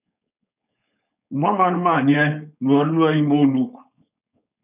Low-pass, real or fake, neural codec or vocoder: 3.6 kHz; fake; codec, 16 kHz, 4.8 kbps, FACodec